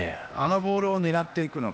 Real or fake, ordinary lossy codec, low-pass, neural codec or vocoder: fake; none; none; codec, 16 kHz, 0.8 kbps, ZipCodec